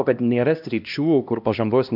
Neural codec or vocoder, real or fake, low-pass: codec, 16 kHz, 1 kbps, X-Codec, HuBERT features, trained on LibriSpeech; fake; 5.4 kHz